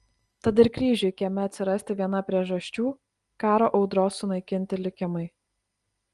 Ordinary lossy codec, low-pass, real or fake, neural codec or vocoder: Opus, 24 kbps; 10.8 kHz; real; none